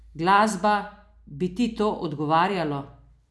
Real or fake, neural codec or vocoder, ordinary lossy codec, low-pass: real; none; none; none